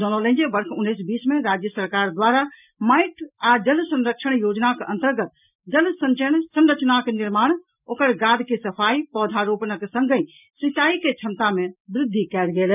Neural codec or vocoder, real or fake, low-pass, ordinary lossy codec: none; real; 3.6 kHz; none